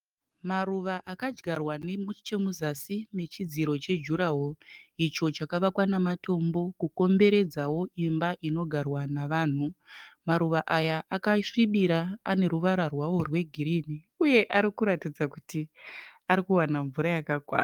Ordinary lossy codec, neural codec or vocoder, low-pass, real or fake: Opus, 32 kbps; codec, 44.1 kHz, 7.8 kbps, DAC; 19.8 kHz; fake